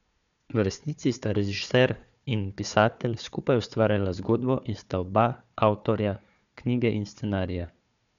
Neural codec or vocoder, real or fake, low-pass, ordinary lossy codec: codec, 16 kHz, 4 kbps, FunCodec, trained on Chinese and English, 50 frames a second; fake; 7.2 kHz; none